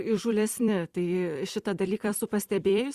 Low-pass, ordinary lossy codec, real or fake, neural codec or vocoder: 14.4 kHz; Opus, 64 kbps; fake; vocoder, 44.1 kHz, 128 mel bands every 256 samples, BigVGAN v2